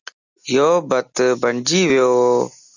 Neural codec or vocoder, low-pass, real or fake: none; 7.2 kHz; real